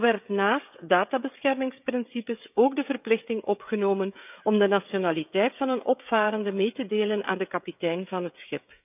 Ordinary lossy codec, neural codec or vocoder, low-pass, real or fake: none; codec, 16 kHz, 16 kbps, FreqCodec, smaller model; 3.6 kHz; fake